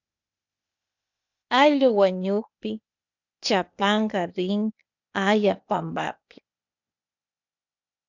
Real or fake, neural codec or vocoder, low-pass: fake; codec, 16 kHz, 0.8 kbps, ZipCodec; 7.2 kHz